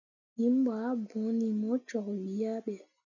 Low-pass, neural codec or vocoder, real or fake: 7.2 kHz; none; real